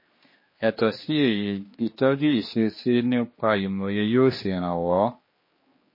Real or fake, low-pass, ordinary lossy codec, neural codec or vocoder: fake; 5.4 kHz; MP3, 24 kbps; codec, 16 kHz, 2 kbps, X-Codec, HuBERT features, trained on general audio